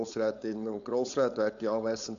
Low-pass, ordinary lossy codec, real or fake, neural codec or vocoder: 7.2 kHz; none; fake; codec, 16 kHz, 4.8 kbps, FACodec